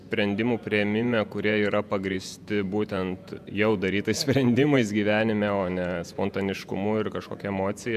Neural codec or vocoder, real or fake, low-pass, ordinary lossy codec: vocoder, 48 kHz, 128 mel bands, Vocos; fake; 14.4 kHz; MP3, 96 kbps